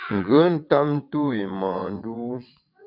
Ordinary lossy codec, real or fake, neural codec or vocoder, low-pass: MP3, 48 kbps; fake; vocoder, 22.05 kHz, 80 mel bands, WaveNeXt; 5.4 kHz